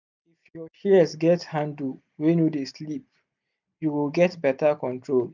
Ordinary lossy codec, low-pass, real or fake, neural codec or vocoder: none; 7.2 kHz; real; none